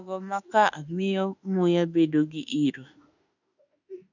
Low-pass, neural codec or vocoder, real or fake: 7.2 kHz; autoencoder, 48 kHz, 32 numbers a frame, DAC-VAE, trained on Japanese speech; fake